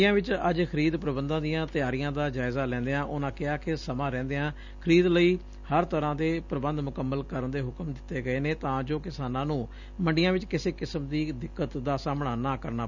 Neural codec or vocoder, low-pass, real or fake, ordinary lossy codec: none; 7.2 kHz; real; none